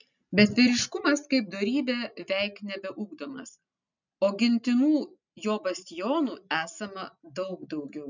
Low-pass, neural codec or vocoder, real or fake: 7.2 kHz; none; real